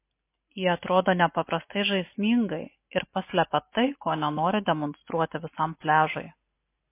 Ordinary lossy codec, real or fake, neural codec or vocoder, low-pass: MP3, 24 kbps; real; none; 3.6 kHz